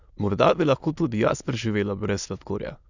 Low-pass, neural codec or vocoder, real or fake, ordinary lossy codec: 7.2 kHz; autoencoder, 22.05 kHz, a latent of 192 numbers a frame, VITS, trained on many speakers; fake; none